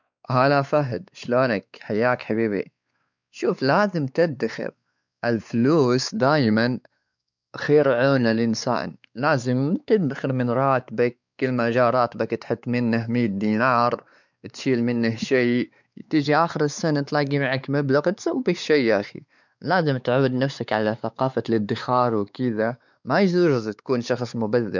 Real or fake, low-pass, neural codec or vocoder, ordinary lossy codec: fake; 7.2 kHz; codec, 16 kHz, 4 kbps, X-Codec, WavLM features, trained on Multilingual LibriSpeech; none